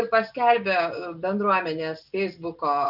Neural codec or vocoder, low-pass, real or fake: none; 5.4 kHz; real